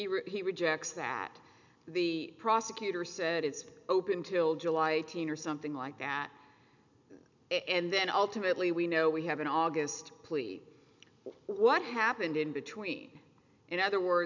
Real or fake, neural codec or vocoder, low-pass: real; none; 7.2 kHz